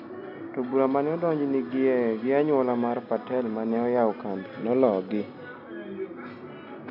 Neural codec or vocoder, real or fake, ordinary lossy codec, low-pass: none; real; none; 5.4 kHz